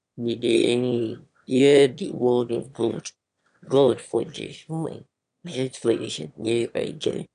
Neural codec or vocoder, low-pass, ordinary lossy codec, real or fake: autoencoder, 22.05 kHz, a latent of 192 numbers a frame, VITS, trained on one speaker; 9.9 kHz; none; fake